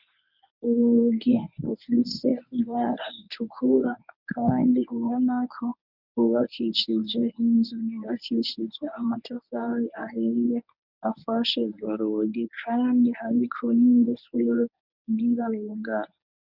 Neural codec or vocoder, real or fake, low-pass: codec, 24 kHz, 0.9 kbps, WavTokenizer, medium speech release version 1; fake; 5.4 kHz